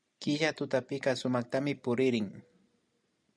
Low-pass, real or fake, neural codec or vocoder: 9.9 kHz; real; none